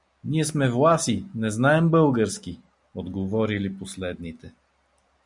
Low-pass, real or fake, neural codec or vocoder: 10.8 kHz; real; none